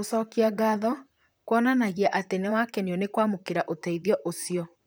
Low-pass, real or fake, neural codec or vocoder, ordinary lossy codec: none; fake; vocoder, 44.1 kHz, 128 mel bands, Pupu-Vocoder; none